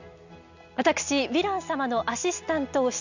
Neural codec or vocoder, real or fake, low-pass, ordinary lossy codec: none; real; 7.2 kHz; none